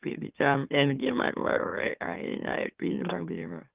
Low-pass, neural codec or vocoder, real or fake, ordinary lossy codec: 3.6 kHz; autoencoder, 44.1 kHz, a latent of 192 numbers a frame, MeloTTS; fake; Opus, 32 kbps